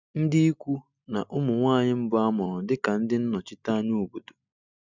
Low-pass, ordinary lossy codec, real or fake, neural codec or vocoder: 7.2 kHz; none; real; none